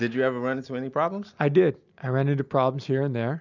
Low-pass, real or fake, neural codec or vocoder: 7.2 kHz; real; none